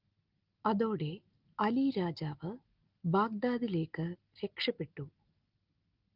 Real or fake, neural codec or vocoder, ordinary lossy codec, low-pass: real; none; Opus, 32 kbps; 5.4 kHz